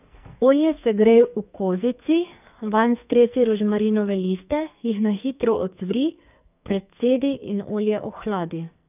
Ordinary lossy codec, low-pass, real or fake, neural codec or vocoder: none; 3.6 kHz; fake; codec, 44.1 kHz, 2.6 kbps, SNAC